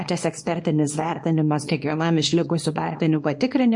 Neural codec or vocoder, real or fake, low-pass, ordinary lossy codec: codec, 24 kHz, 0.9 kbps, WavTokenizer, small release; fake; 10.8 kHz; MP3, 48 kbps